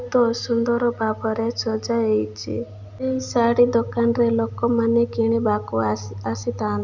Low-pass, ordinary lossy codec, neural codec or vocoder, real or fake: 7.2 kHz; none; none; real